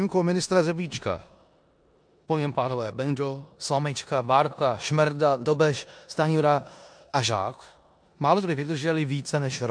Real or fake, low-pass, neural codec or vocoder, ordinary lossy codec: fake; 9.9 kHz; codec, 16 kHz in and 24 kHz out, 0.9 kbps, LongCat-Audio-Codec, four codebook decoder; MP3, 64 kbps